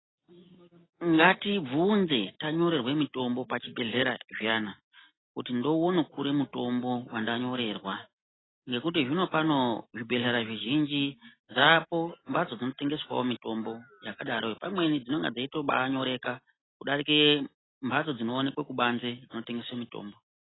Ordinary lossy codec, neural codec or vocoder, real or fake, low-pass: AAC, 16 kbps; none; real; 7.2 kHz